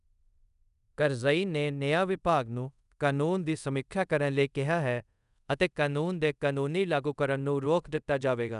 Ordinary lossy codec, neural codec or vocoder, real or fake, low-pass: none; codec, 24 kHz, 0.5 kbps, DualCodec; fake; 10.8 kHz